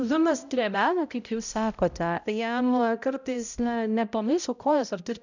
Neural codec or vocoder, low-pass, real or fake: codec, 16 kHz, 0.5 kbps, X-Codec, HuBERT features, trained on balanced general audio; 7.2 kHz; fake